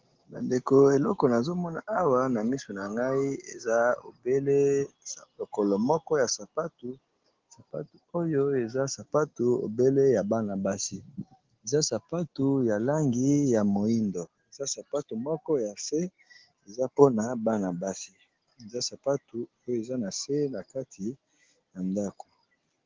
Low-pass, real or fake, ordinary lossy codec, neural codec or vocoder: 7.2 kHz; real; Opus, 16 kbps; none